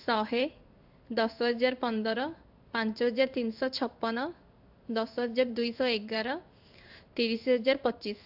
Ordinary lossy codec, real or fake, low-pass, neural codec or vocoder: AAC, 48 kbps; fake; 5.4 kHz; codec, 16 kHz in and 24 kHz out, 1 kbps, XY-Tokenizer